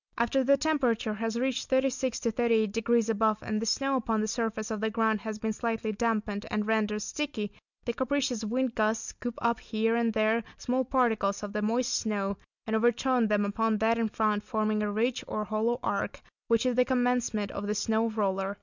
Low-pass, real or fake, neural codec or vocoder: 7.2 kHz; real; none